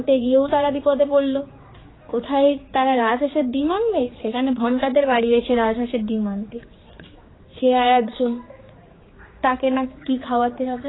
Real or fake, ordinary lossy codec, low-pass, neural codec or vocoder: fake; AAC, 16 kbps; 7.2 kHz; codec, 16 kHz, 4 kbps, X-Codec, HuBERT features, trained on general audio